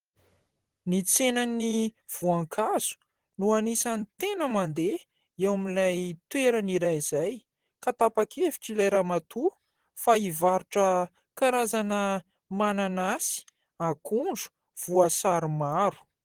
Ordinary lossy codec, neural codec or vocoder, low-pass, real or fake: Opus, 16 kbps; vocoder, 44.1 kHz, 128 mel bands, Pupu-Vocoder; 19.8 kHz; fake